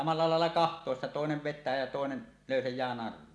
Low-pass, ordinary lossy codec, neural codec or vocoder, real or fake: none; none; none; real